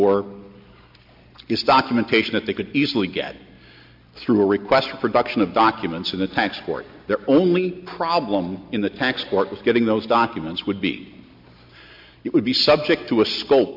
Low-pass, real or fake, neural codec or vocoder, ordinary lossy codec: 5.4 kHz; real; none; MP3, 48 kbps